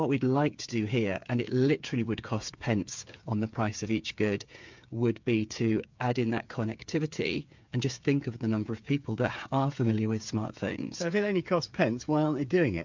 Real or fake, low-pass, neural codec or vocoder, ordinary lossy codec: fake; 7.2 kHz; codec, 16 kHz, 8 kbps, FreqCodec, smaller model; MP3, 64 kbps